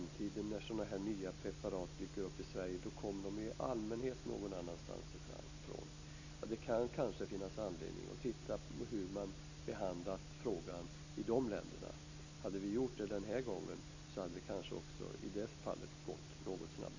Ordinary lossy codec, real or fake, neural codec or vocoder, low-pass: AAC, 48 kbps; real; none; 7.2 kHz